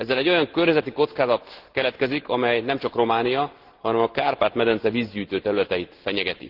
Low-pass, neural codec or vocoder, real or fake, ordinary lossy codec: 5.4 kHz; none; real; Opus, 16 kbps